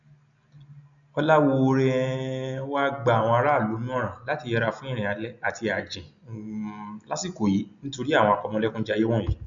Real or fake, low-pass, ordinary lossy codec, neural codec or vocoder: real; 7.2 kHz; none; none